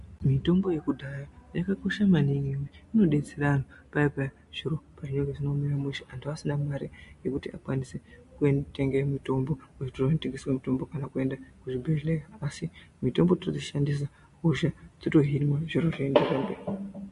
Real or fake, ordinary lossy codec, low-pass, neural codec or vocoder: real; MP3, 48 kbps; 14.4 kHz; none